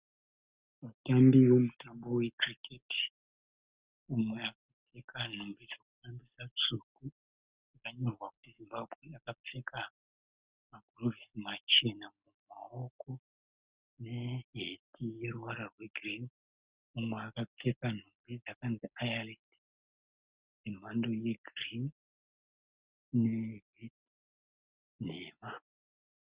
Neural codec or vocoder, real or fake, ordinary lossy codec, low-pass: none; real; Opus, 64 kbps; 3.6 kHz